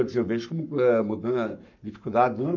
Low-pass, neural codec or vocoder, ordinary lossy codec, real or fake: 7.2 kHz; codec, 44.1 kHz, 7.8 kbps, Pupu-Codec; none; fake